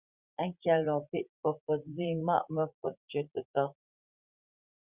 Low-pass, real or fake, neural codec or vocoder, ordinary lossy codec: 3.6 kHz; fake; vocoder, 44.1 kHz, 80 mel bands, Vocos; Opus, 64 kbps